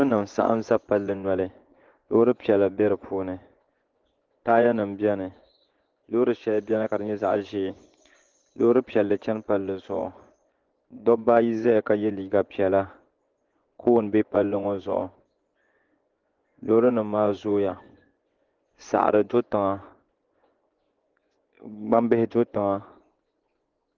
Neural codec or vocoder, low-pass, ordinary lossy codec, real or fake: vocoder, 24 kHz, 100 mel bands, Vocos; 7.2 kHz; Opus, 16 kbps; fake